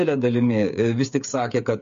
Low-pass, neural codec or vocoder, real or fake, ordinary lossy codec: 7.2 kHz; codec, 16 kHz, 8 kbps, FreqCodec, smaller model; fake; MP3, 48 kbps